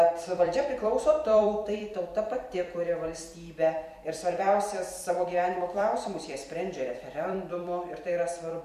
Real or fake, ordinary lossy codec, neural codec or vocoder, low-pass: fake; MP3, 64 kbps; vocoder, 44.1 kHz, 128 mel bands every 256 samples, BigVGAN v2; 14.4 kHz